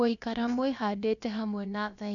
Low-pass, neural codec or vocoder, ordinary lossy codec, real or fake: 7.2 kHz; codec, 16 kHz, about 1 kbps, DyCAST, with the encoder's durations; none; fake